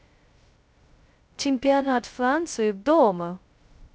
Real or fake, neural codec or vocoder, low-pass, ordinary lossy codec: fake; codec, 16 kHz, 0.2 kbps, FocalCodec; none; none